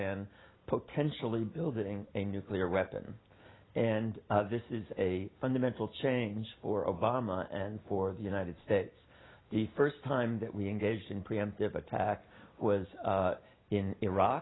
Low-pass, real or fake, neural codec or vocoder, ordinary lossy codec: 7.2 kHz; real; none; AAC, 16 kbps